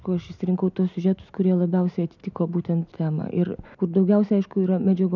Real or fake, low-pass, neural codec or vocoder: real; 7.2 kHz; none